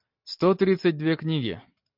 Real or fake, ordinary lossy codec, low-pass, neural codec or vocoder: real; MP3, 48 kbps; 5.4 kHz; none